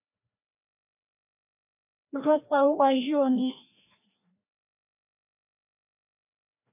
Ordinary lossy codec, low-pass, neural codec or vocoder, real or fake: AAC, 32 kbps; 3.6 kHz; codec, 16 kHz, 1 kbps, FreqCodec, larger model; fake